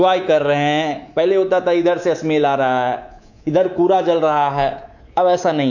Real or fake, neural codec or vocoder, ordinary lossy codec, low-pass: fake; codec, 24 kHz, 3.1 kbps, DualCodec; none; 7.2 kHz